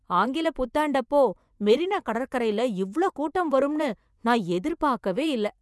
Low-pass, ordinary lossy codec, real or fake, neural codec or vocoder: none; none; fake; vocoder, 24 kHz, 100 mel bands, Vocos